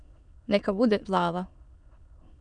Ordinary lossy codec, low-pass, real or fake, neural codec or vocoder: MP3, 96 kbps; 9.9 kHz; fake; autoencoder, 22.05 kHz, a latent of 192 numbers a frame, VITS, trained on many speakers